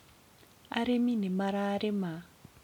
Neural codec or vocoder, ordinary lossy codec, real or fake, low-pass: none; none; real; 19.8 kHz